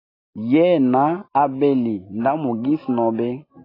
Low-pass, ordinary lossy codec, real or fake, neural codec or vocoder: 5.4 kHz; AAC, 24 kbps; real; none